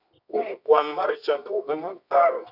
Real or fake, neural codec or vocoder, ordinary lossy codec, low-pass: fake; codec, 24 kHz, 0.9 kbps, WavTokenizer, medium music audio release; none; 5.4 kHz